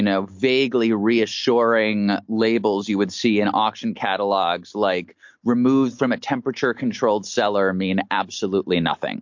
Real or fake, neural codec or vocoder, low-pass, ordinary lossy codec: real; none; 7.2 kHz; MP3, 48 kbps